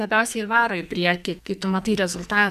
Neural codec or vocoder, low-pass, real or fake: codec, 44.1 kHz, 2.6 kbps, SNAC; 14.4 kHz; fake